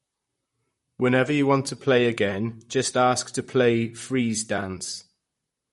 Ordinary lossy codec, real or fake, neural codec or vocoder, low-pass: MP3, 48 kbps; fake; vocoder, 44.1 kHz, 128 mel bands, Pupu-Vocoder; 19.8 kHz